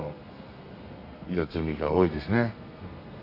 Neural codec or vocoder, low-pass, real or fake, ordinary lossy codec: codec, 32 kHz, 1.9 kbps, SNAC; 5.4 kHz; fake; MP3, 32 kbps